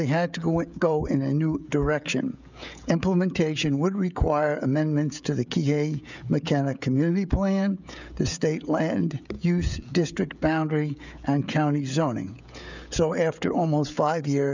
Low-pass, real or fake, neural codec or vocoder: 7.2 kHz; fake; codec, 16 kHz, 16 kbps, FreqCodec, smaller model